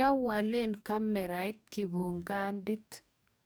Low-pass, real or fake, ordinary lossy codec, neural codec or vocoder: none; fake; none; codec, 44.1 kHz, 2.6 kbps, DAC